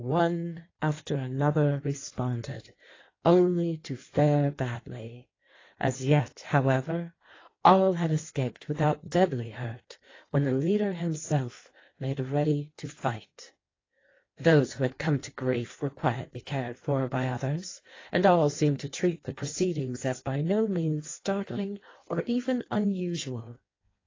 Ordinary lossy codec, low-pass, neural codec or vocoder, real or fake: AAC, 32 kbps; 7.2 kHz; codec, 16 kHz in and 24 kHz out, 1.1 kbps, FireRedTTS-2 codec; fake